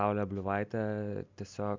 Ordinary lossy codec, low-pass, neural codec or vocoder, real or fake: MP3, 64 kbps; 7.2 kHz; none; real